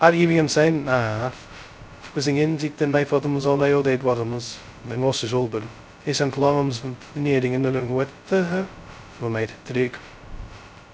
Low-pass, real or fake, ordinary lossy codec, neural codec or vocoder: none; fake; none; codec, 16 kHz, 0.2 kbps, FocalCodec